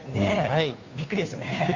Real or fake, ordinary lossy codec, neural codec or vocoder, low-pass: fake; Opus, 64 kbps; codec, 16 kHz, 2 kbps, FunCodec, trained on Chinese and English, 25 frames a second; 7.2 kHz